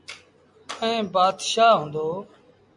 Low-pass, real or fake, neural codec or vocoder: 10.8 kHz; real; none